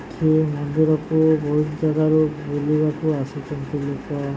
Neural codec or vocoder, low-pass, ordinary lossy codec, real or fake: none; none; none; real